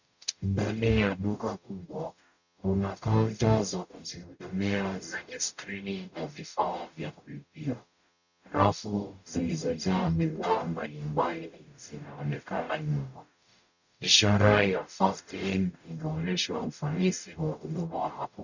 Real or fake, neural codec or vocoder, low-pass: fake; codec, 44.1 kHz, 0.9 kbps, DAC; 7.2 kHz